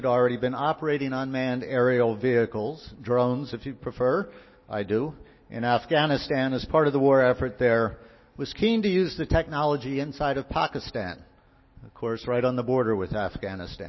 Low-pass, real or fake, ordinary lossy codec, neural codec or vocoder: 7.2 kHz; real; MP3, 24 kbps; none